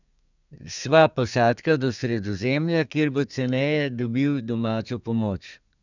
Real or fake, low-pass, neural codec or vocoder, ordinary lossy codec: fake; 7.2 kHz; codec, 32 kHz, 1.9 kbps, SNAC; none